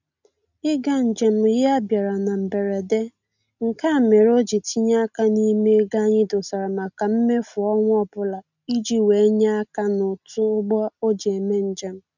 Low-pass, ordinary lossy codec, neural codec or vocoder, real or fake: 7.2 kHz; none; none; real